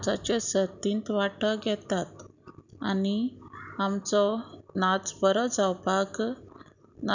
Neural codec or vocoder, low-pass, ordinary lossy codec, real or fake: none; 7.2 kHz; none; real